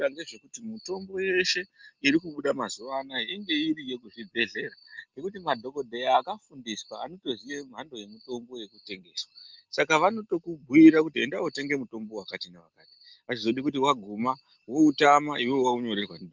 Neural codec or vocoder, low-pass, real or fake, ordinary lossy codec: none; 7.2 kHz; real; Opus, 32 kbps